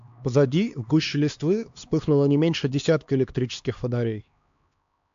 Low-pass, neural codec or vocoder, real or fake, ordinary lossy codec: 7.2 kHz; codec, 16 kHz, 2 kbps, X-Codec, HuBERT features, trained on LibriSpeech; fake; AAC, 64 kbps